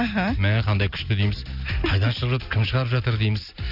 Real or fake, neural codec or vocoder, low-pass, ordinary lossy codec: real; none; 5.4 kHz; none